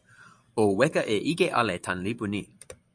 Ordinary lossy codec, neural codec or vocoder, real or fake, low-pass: MP3, 64 kbps; vocoder, 44.1 kHz, 128 mel bands every 512 samples, BigVGAN v2; fake; 9.9 kHz